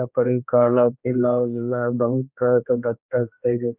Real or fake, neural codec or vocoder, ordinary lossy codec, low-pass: fake; codec, 16 kHz, 1 kbps, X-Codec, HuBERT features, trained on general audio; none; 3.6 kHz